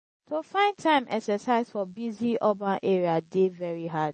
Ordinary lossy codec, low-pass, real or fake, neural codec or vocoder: MP3, 32 kbps; 10.8 kHz; real; none